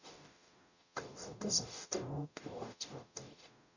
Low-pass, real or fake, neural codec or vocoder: 7.2 kHz; fake; codec, 44.1 kHz, 0.9 kbps, DAC